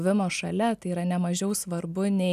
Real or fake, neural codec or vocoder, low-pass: real; none; 14.4 kHz